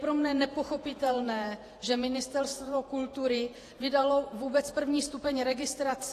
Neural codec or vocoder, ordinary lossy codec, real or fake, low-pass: vocoder, 48 kHz, 128 mel bands, Vocos; AAC, 48 kbps; fake; 14.4 kHz